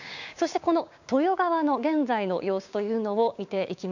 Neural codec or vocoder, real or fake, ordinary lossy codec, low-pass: codec, 16 kHz, 6 kbps, DAC; fake; none; 7.2 kHz